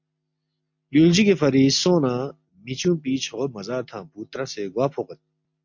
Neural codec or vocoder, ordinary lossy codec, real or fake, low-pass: none; MP3, 48 kbps; real; 7.2 kHz